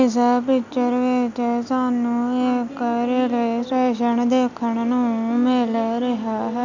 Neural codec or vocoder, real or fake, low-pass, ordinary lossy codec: none; real; 7.2 kHz; none